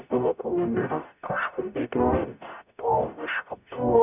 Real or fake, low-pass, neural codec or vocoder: fake; 3.6 kHz; codec, 44.1 kHz, 0.9 kbps, DAC